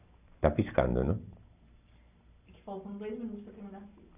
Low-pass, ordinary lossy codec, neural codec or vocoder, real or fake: 3.6 kHz; none; none; real